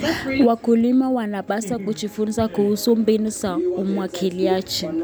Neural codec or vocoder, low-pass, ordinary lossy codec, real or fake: none; none; none; real